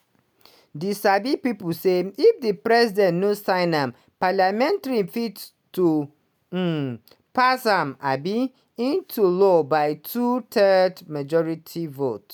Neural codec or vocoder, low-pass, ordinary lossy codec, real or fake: none; none; none; real